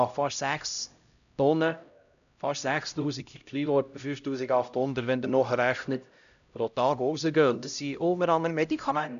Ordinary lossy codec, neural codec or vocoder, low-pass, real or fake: none; codec, 16 kHz, 0.5 kbps, X-Codec, HuBERT features, trained on LibriSpeech; 7.2 kHz; fake